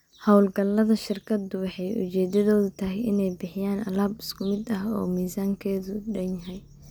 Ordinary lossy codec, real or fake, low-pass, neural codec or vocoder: none; real; none; none